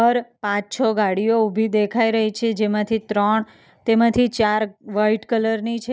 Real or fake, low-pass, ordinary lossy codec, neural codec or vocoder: real; none; none; none